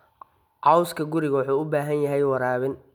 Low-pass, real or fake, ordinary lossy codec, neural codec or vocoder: 19.8 kHz; real; none; none